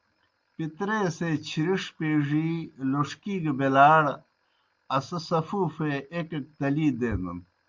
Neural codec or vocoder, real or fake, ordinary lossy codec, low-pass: none; real; Opus, 24 kbps; 7.2 kHz